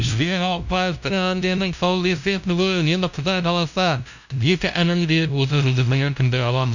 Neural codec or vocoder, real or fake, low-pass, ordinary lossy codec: codec, 16 kHz, 0.5 kbps, FunCodec, trained on LibriTTS, 25 frames a second; fake; 7.2 kHz; none